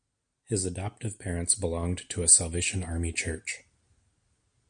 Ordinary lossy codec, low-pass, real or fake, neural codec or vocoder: MP3, 96 kbps; 9.9 kHz; real; none